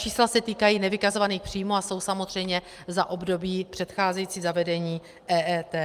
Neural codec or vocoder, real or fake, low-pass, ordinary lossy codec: none; real; 14.4 kHz; Opus, 32 kbps